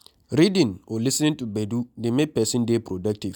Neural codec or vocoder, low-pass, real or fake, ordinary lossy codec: none; 19.8 kHz; real; none